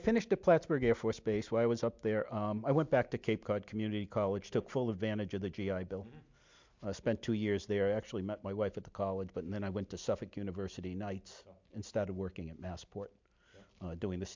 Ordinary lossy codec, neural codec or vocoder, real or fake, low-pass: MP3, 64 kbps; none; real; 7.2 kHz